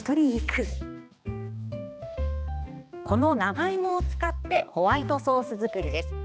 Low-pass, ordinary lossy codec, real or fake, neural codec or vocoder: none; none; fake; codec, 16 kHz, 2 kbps, X-Codec, HuBERT features, trained on balanced general audio